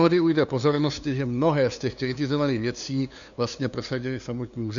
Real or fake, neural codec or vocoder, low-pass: fake; codec, 16 kHz, 2 kbps, FunCodec, trained on LibriTTS, 25 frames a second; 7.2 kHz